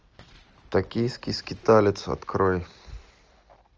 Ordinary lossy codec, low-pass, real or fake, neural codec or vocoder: Opus, 24 kbps; 7.2 kHz; real; none